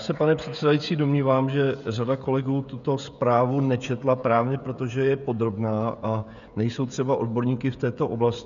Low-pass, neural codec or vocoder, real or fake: 7.2 kHz; codec, 16 kHz, 16 kbps, FreqCodec, smaller model; fake